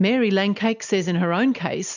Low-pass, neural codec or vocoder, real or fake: 7.2 kHz; none; real